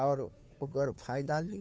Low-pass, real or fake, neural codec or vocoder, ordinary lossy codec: none; fake; codec, 16 kHz, 2 kbps, FunCodec, trained on Chinese and English, 25 frames a second; none